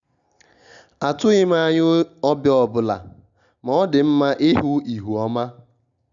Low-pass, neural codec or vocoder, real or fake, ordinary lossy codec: 7.2 kHz; none; real; none